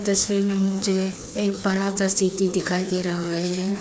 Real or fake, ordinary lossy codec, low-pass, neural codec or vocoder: fake; none; none; codec, 16 kHz, 2 kbps, FreqCodec, larger model